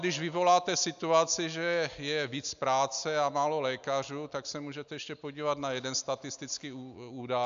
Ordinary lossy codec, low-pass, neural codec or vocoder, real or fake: AAC, 96 kbps; 7.2 kHz; none; real